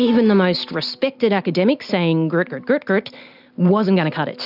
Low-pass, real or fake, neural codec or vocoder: 5.4 kHz; real; none